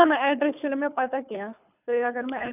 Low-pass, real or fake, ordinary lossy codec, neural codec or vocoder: 3.6 kHz; fake; none; codec, 24 kHz, 3 kbps, HILCodec